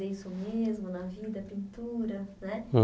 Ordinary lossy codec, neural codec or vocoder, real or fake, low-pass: none; none; real; none